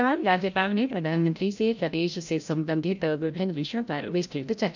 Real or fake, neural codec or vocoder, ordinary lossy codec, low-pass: fake; codec, 16 kHz, 0.5 kbps, FreqCodec, larger model; none; 7.2 kHz